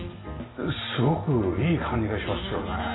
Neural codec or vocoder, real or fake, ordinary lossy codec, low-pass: none; real; AAC, 16 kbps; 7.2 kHz